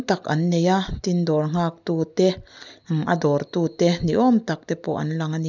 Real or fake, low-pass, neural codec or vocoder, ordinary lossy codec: real; 7.2 kHz; none; none